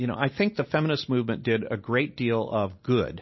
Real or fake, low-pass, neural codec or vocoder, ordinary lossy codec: real; 7.2 kHz; none; MP3, 24 kbps